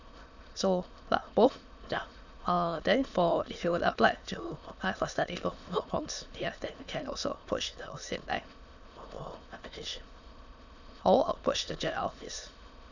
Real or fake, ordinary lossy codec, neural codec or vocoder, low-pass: fake; none; autoencoder, 22.05 kHz, a latent of 192 numbers a frame, VITS, trained on many speakers; 7.2 kHz